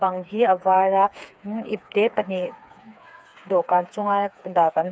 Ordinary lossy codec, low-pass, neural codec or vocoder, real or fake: none; none; codec, 16 kHz, 4 kbps, FreqCodec, smaller model; fake